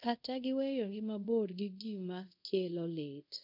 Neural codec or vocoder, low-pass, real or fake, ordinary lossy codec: codec, 24 kHz, 0.5 kbps, DualCodec; 5.4 kHz; fake; none